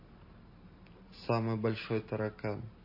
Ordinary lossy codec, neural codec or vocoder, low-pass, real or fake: MP3, 24 kbps; none; 5.4 kHz; real